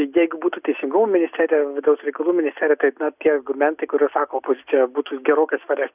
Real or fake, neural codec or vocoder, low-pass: real; none; 3.6 kHz